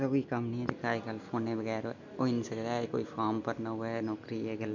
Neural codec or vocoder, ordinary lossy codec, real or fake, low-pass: none; none; real; 7.2 kHz